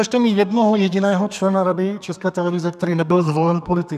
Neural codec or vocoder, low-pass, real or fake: codec, 32 kHz, 1.9 kbps, SNAC; 14.4 kHz; fake